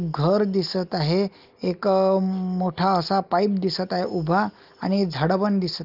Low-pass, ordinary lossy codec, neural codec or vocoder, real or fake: 5.4 kHz; Opus, 32 kbps; none; real